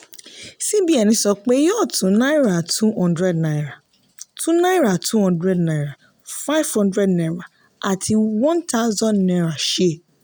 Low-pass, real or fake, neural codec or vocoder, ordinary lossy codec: none; real; none; none